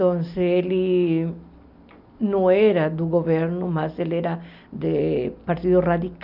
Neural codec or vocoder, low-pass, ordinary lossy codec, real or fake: none; 5.4 kHz; none; real